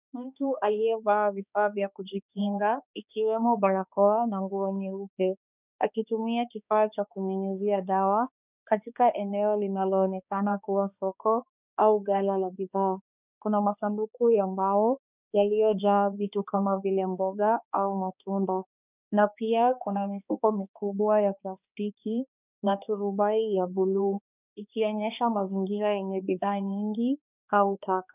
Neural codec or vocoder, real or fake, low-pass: codec, 16 kHz, 2 kbps, X-Codec, HuBERT features, trained on balanced general audio; fake; 3.6 kHz